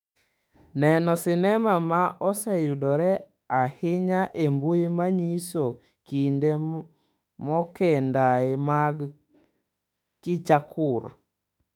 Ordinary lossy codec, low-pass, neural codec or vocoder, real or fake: none; 19.8 kHz; autoencoder, 48 kHz, 32 numbers a frame, DAC-VAE, trained on Japanese speech; fake